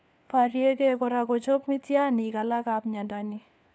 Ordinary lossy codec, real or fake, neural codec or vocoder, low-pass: none; fake; codec, 16 kHz, 4 kbps, FunCodec, trained on LibriTTS, 50 frames a second; none